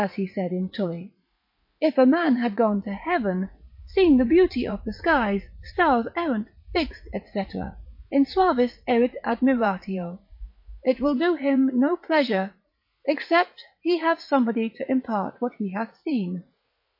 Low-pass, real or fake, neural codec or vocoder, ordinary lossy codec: 5.4 kHz; fake; vocoder, 44.1 kHz, 80 mel bands, Vocos; MP3, 32 kbps